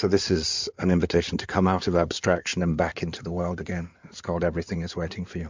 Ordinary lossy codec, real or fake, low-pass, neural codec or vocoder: MP3, 64 kbps; fake; 7.2 kHz; codec, 16 kHz in and 24 kHz out, 2.2 kbps, FireRedTTS-2 codec